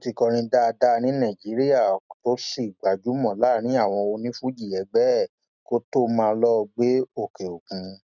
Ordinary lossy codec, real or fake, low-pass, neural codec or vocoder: none; real; 7.2 kHz; none